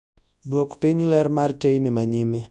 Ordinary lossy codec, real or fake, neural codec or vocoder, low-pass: none; fake; codec, 24 kHz, 0.9 kbps, WavTokenizer, large speech release; 10.8 kHz